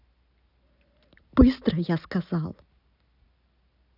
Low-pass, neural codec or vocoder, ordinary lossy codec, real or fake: 5.4 kHz; none; none; real